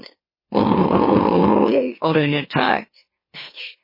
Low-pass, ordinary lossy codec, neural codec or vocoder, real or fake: 5.4 kHz; MP3, 24 kbps; autoencoder, 44.1 kHz, a latent of 192 numbers a frame, MeloTTS; fake